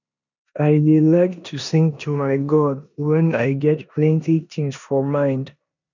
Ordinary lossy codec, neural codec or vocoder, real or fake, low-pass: none; codec, 16 kHz in and 24 kHz out, 0.9 kbps, LongCat-Audio-Codec, four codebook decoder; fake; 7.2 kHz